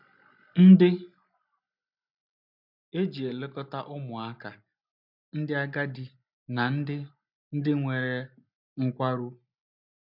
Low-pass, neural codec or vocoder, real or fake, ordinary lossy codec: 5.4 kHz; none; real; none